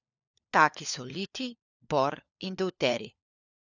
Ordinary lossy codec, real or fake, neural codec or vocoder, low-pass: none; fake; codec, 16 kHz, 4 kbps, FunCodec, trained on LibriTTS, 50 frames a second; 7.2 kHz